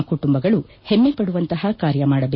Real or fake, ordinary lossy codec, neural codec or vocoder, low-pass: real; MP3, 24 kbps; none; 7.2 kHz